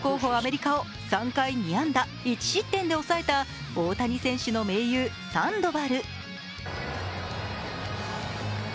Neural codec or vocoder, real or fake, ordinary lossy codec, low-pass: none; real; none; none